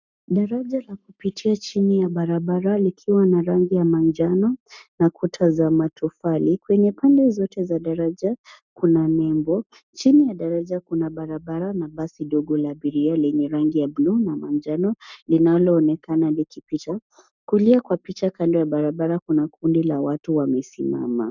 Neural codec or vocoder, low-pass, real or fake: codec, 44.1 kHz, 7.8 kbps, Pupu-Codec; 7.2 kHz; fake